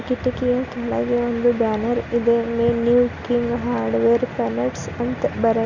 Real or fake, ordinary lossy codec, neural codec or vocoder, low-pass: real; none; none; 7.2 kHz